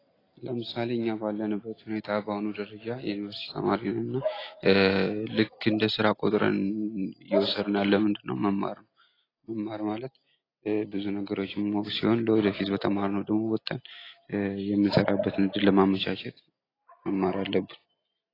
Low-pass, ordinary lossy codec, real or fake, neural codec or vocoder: 5.4 kHz; AAC, 24 kbps; real; none